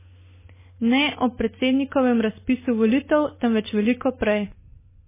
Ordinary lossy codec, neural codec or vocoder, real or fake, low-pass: MP3, 16 kbps; none; real; 3.6 kHz